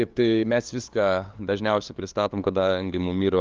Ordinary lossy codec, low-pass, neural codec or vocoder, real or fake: Opus, 32 kbps; 7.2 kHz; codec, 16 kHz, 2 kbps, FunCodec, trained on LibriTTS, 25 frames a second; fake